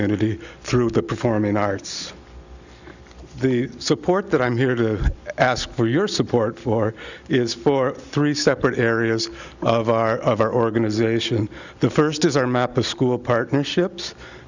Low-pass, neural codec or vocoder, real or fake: 7.2 kHz; none; real